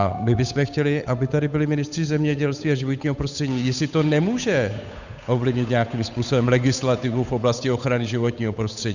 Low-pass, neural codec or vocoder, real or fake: 7.2 kHz; codec, 16 kHz, 8 kbps, FunCodec, trained on Chinese and English, 25 frames a second; fake